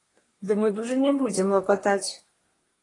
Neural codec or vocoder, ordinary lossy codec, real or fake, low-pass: codec, 24 kHz, 1 kbps, SNAC; AAC, 32 kbps; fake; 10.8 kHz